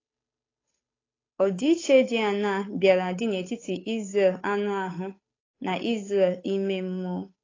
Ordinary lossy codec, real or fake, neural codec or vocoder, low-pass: AAC, 32 kbps; fake; codec, 16 kHz, 8 kbps, FunCodec, trained on Chinese and English, 25 frames a second; 7.2 kHz